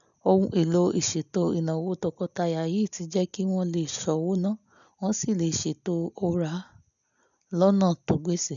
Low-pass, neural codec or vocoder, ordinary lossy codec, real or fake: 7.2 kHz; none; none; real